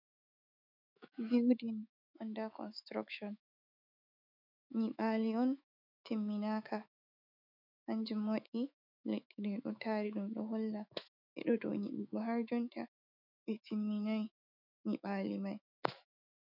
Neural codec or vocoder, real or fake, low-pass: autoencoder, 48 kHz, 128 numbers a frame, DAC-VAE, trained on Japanese speech; fake; 5.4 kHz